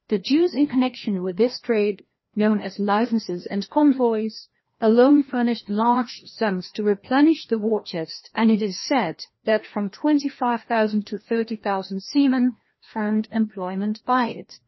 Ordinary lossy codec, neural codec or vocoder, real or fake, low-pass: MP3, 24 kbps; codec, 16 kHz, 1 kbps, FreqCodec, larger model; fake; 7.2 kHz